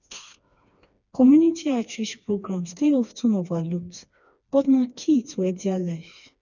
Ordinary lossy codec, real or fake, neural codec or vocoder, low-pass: none; fake; codec, 16 kHz, 2 kbps, FreqCodec, smaller model; 7.2 kHz